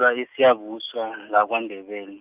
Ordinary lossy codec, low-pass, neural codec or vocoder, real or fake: Opus, 32 kbps; 3.6 kHz; none; real